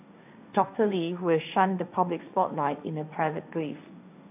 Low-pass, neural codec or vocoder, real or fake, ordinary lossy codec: 3.6 kHz; codec, 16 kHz, 1.1 kbps, Voila-Tokenizer; fake; AAC, 32 kbps